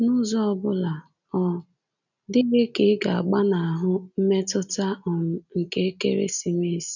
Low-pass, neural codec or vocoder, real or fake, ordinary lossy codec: 7.2 kHz; none; real; none